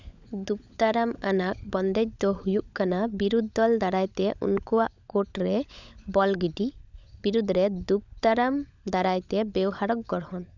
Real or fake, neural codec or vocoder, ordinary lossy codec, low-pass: fake; codec, 16 kHz, 16 kbps, FunCodec, trained on LibriTTS, 50 frames a second; none; 7.2 kHz